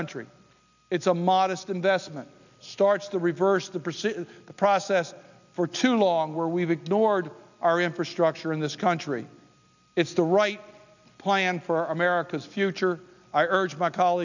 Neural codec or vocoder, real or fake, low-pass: none; real; 7.2 kHz